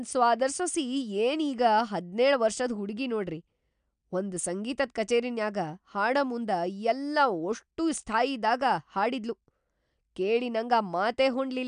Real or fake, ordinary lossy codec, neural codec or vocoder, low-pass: real; none; none; 9.9 kHz